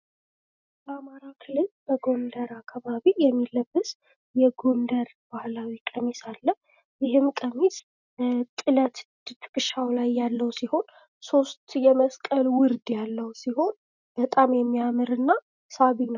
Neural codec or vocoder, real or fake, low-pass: none; real; 7.2 kHz